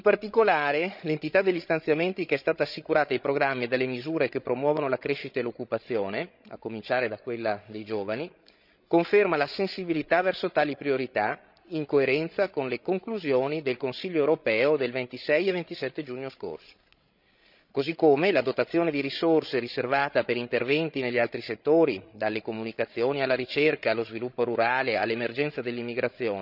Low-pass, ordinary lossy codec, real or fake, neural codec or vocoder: 5.4 kHz; none; fake; codec, 16 kHz, 16 kbps, FreqCodec, larger model